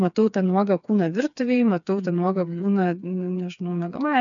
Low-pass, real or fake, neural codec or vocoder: 7.2 kHz; fake; codec, 16 kHz, 4 kbps, FreqCodec, smaller model